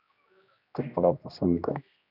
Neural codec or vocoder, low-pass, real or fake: codec, 16 kHz, 1 kbps, X-Codec, HuBERT features, trained on general audio; 5.4 kHz; fake